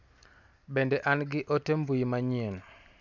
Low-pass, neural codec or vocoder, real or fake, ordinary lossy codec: 7.2 kHz; none; real; none